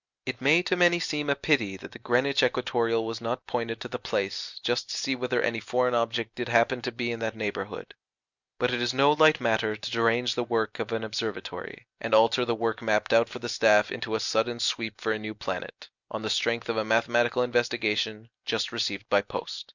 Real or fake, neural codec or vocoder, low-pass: real; none; 7.2 kHz